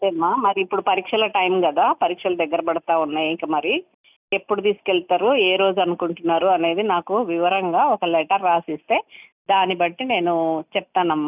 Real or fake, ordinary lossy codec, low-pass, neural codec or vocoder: real; none; 3.6 kHz; none